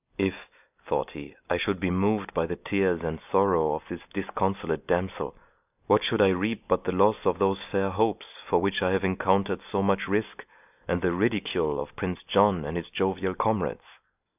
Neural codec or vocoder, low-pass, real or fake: none; 3.6 kHz; real